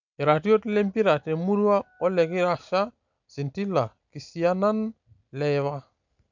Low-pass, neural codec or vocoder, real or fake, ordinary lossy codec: 7.2 kHz; none; real; none